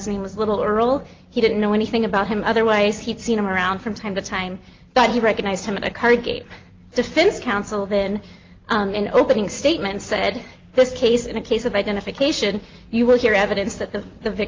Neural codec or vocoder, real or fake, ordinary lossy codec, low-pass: none; real; Opus, 32 kbps; 7.2 kHz